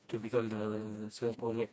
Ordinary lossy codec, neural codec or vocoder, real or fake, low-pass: none; codec, 16 kHz, 1 kbps, FreqCodec, smaller model; fake; none